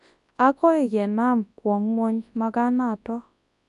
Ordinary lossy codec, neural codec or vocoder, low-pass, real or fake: none; codec, 24 kHz, 0.9 kbps, WavTokenizer, large speech release; 10.8 kHz; fake